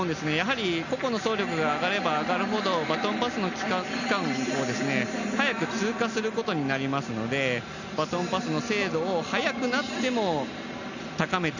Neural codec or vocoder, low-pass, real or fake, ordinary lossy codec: none; 7.2 kHz; real; none